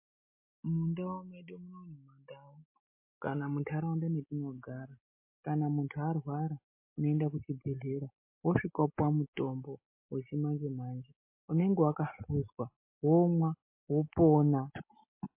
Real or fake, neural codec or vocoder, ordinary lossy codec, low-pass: real; none; MP3, 24 kbps; 3.6 kHz